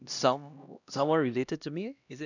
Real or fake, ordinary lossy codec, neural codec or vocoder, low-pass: fake; none; codec, 16 kHz, 1 kbps, X-Codec, HuBERT features, trained on LibriSpeech; 7.2 kHz